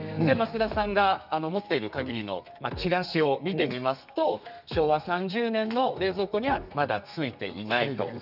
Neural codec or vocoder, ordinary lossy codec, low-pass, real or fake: codec, 32 kHz, 1.9 kbps, SNAC; none; 5.4 kHz; fake